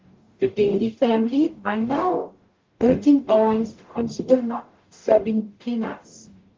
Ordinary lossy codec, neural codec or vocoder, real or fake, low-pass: Opus, 32 kbps; codec, 44.1 kHz, 0.9 kbps, DAC; fake; 7.2 kHz